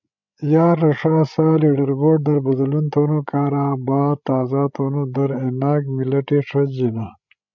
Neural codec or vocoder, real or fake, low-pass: codec, 16 kHz, 16 kbps, FreqCodec, larger model; fake; 7.2 kHz